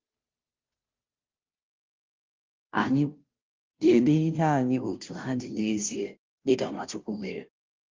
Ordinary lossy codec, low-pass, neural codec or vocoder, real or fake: Opus, 32 kbps; 7.2 kHz; codec, 16 kHz, 0.5 kbps, FunCodec, trained on Chinese and English, 25 frames a second; fake